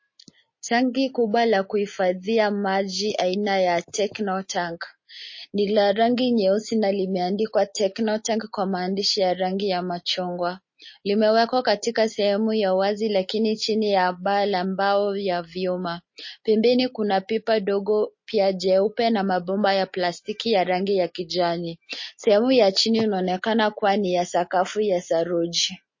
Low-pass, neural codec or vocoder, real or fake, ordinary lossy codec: 7.2 kHz; none; real; MP3, 32 kbps